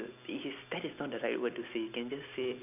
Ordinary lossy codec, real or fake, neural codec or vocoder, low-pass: none; real; none; 3.6 kHz